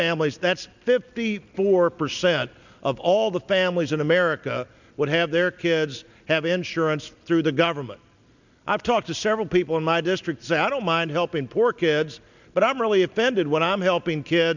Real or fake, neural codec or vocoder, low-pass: real; none; 7.2 kHz